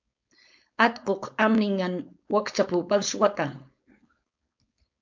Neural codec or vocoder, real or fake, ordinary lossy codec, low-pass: codec, 16 kHz, 4.8 kbps, FACodec; fake; MP3, 64 kbps; 7.2 kHz